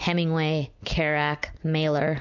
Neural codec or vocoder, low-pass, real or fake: none; 7.2 kHz; real